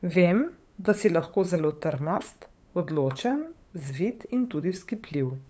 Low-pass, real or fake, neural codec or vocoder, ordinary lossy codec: none; fake; codec, 16 kHz, 8 kbps, FunCodec, trained on LibriTTS, 25 frames a second; none